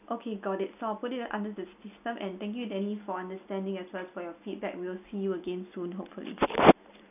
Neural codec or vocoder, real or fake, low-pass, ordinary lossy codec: none; real; 3.6 kHz; none